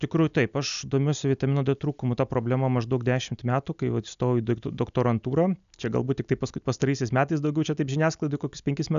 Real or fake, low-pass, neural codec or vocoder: real; 7.2 kHz; none